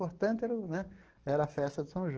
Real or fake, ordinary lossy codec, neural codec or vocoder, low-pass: fake; Opus, 16 kbps; codec, 44.1 kHz, 7.8 kbps, DAC; 7.2 kHz